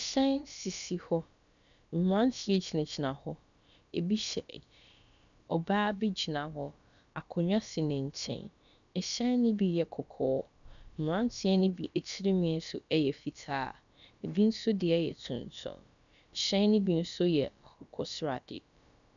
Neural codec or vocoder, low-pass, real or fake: codec, 16 kHz, about 1 kbps, DyCAST, with the encoder's durations; 7.2 kHz; fake